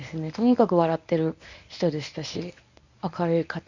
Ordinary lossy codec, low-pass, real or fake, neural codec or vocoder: none; 7.2 kHz; fake; codec, 24 kHz, 0.9 kbps, WavTokenizer, medium speech release version 1